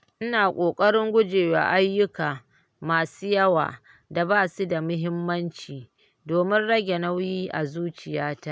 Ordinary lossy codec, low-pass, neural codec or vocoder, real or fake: none; none; none; real